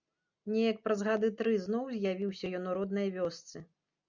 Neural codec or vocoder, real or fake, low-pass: none; real; 7.2 kHz